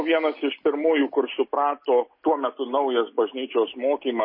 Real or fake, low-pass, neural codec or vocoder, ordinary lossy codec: real; 5.4 kHz; none; MP3, 24 kbps